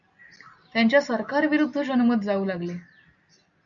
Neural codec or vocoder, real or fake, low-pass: none; real; 7.2 kHz